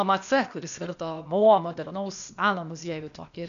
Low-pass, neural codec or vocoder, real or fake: 7.2 kHz; codec, 16 kHz, 0.8 kbps, ZipCodec; fake